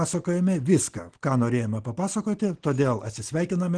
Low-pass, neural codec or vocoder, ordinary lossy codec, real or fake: 9.9 kHz; none; Opus, 16 kbps; real